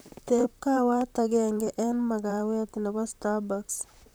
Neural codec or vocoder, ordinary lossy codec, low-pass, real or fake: vocoder, 44.1 kHz, 128 mel bands, Pupu-Vocoder; none; none; fake